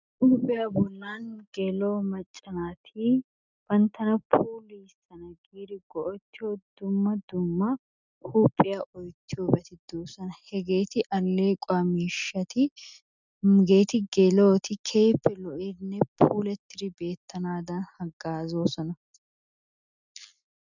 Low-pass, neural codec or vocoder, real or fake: 7.2 kHz; none; real